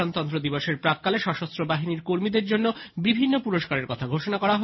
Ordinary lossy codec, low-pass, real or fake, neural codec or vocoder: MP3, 24 kbps; 7.2 kHz; real; none